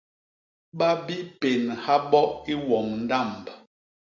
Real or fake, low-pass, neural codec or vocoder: real; 7.2 kHz; none